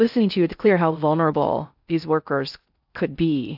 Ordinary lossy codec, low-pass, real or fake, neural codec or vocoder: AAC, 48 kbps; 5.4 kHz; fake; codec, 16 kHz in and 24 kHz out, 0.6 kbps, FocalCodec, streaming, 4096 codes